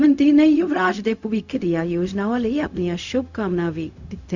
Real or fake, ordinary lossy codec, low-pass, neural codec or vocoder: fake; none; 7.2 kHz; codec, 16 kHz, 0.4 kbps, LongCat-Audio-Codec